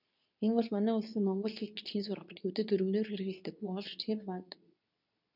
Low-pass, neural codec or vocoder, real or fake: 5.4 kHz; codec, 24 kHz, 0.9 kbps, WavTokenizer, medium speech release version 2; fake